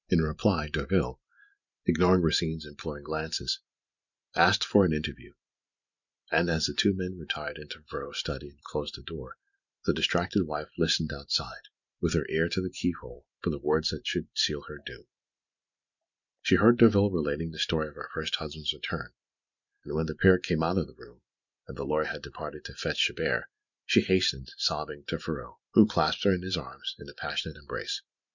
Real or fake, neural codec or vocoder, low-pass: fake; vocoder, 44.1 kHz, 128 mel bands every 512 samples, BigVGAN v2; 7.2 kHz